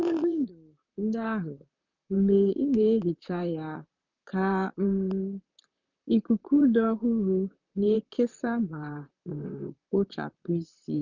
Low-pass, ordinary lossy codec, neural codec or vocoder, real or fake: 7.2 kHz; none; vocoder, 44.1 kHz, 128 mel bands, Pupu-Vocoder; fake